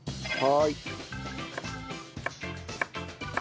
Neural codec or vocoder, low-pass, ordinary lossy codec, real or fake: none; none; none; real